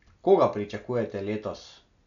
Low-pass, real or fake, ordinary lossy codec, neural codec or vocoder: 7.2 kHz; real; none; none